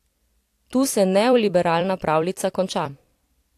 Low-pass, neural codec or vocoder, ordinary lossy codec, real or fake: 14.4 kHz; vocoder, 44.1 kHz, 128 mel bands every 256 samples, BigVGAN v2; AAC, 64 kbps; fake